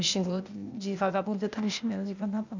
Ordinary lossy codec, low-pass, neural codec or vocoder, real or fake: none; 7.2 kHz; codec, 16 kHz, 0.8 kbps, ZipCodec; fake